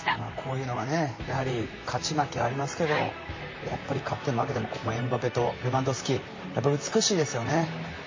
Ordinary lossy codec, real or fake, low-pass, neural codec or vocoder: MP3, 32 kbps; fake; 7.2 kHz; vocoder, 44.1 kHz, 128 mel bands, Pupu-Vocoder